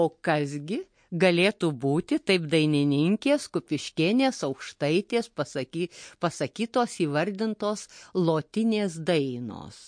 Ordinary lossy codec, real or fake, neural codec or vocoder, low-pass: MP3, 48 kbps; real; none; 9.9 kHz